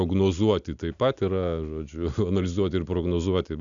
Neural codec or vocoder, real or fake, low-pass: none; real; 7.2 kHz